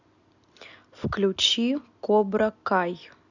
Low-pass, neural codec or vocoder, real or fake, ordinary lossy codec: 7.2 kHz; none; real; none